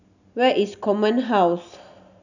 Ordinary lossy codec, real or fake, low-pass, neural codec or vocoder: none; real; 7.2 kHz; none